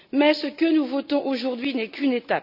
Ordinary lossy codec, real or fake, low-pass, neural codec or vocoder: none; real; 5.4 kHz; none